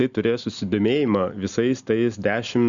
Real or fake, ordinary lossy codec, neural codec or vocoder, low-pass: real; Opus, 64 kbps; none; 7.2 kHz